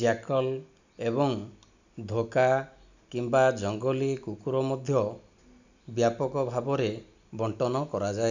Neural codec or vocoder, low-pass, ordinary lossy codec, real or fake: none; 7.2 kHz; none; real